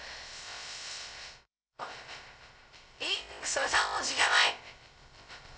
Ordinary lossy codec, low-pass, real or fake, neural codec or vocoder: none; none; fake; codec, 16 kHz, 0.2 kbps, FocalCodec